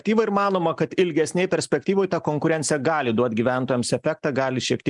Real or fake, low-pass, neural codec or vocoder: real; 10.8 kHz; none